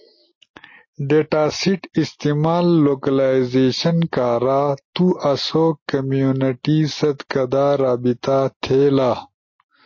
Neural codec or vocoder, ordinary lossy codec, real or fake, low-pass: none; MP3, 32 kbps; real; 7.2 kHz